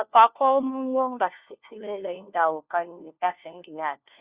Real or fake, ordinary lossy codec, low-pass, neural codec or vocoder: fake; Opus, 64 kbps; 3.6 kHz; codec, 16 kHz, 1 kbps, FunCodec, trained on LibriTTS, 50 frames a second